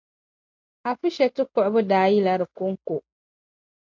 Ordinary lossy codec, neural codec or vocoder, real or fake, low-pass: MP3, 64 kbps; none; real; 7.2 kHz